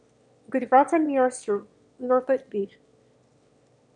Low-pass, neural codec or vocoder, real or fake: 9.9 kHz; autoencoder, 22.05 kHz, a latent of 192 numbers a frame, VITS, trained on one speaker; fake